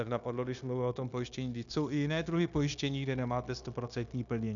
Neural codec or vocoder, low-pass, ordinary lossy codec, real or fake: codec, 16 kHz, 0.9 kbps, LongCat-Audio-Codec; 7.2 kHz; MP3, 96 kbps; fake